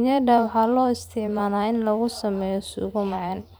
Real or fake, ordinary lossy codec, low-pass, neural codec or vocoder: fake; none; none; vocoder, 44.1 kHz, 128 mel bands every 512 samples, BigVGAN v2